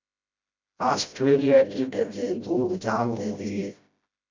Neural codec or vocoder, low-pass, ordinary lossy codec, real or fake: codec, 16 kHz, 0.5 kbps, FreqCodec, smaller model; 7.2 kHz; MP3, 64 kbps; fake